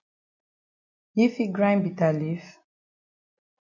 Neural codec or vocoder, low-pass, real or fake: none; 7.2 kHz; real